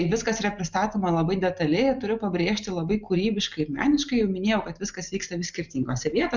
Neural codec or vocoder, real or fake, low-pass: none; real; 7.2 kHz